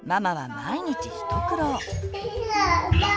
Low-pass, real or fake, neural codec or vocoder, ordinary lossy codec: none; real; none; none